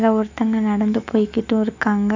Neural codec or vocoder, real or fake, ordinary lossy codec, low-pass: autoencoder, 48 kHz, 128 numbers a frame, DAC-VAE, trained on Japanese speech; fake; AAC, 48 kbps; 7.2 kHz